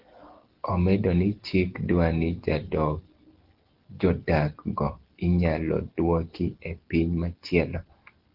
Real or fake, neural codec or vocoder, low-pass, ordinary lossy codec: real; none; 5.4 kHz; Opus, 16 kbps